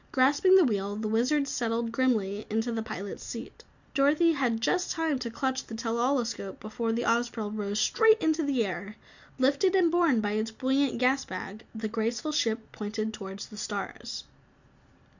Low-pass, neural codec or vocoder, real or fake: 7.2 kHz; none; real